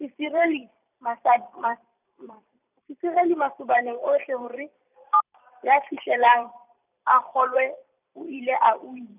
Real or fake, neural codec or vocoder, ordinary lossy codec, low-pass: fake; vocoder, 44.1 kHz, 128 mel bands every 256 samples, BigVGAN v2; none; 3.6 kHz